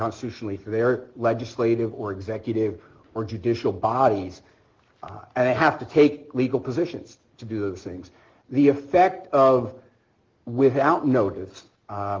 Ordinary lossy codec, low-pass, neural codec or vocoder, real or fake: Opus, 16 kbps; 7.2 kHz; none; real